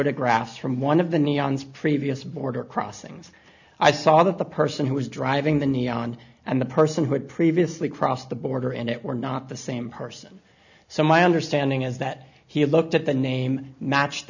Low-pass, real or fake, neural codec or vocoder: 7.2 kHz; real; none